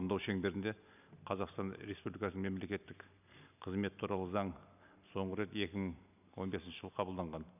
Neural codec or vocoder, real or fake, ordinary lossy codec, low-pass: none; real; none; 3.6 kHz